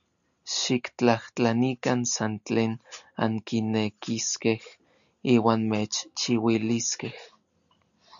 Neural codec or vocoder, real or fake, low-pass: none; real; 7.2 kHz